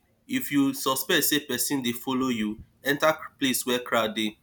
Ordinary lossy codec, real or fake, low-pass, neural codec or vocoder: none; real; none; none